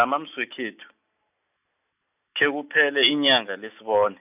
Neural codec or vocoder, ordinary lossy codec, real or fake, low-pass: none; none; real; 3.6 kHz